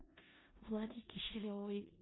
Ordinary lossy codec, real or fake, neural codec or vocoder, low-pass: AAC, 16 kbps; fake; codec, 16 kHz in and 24 kHz out, 0.4 kbps, LongCat-Audio-Codec, four codebook decoder; 7.2 kHz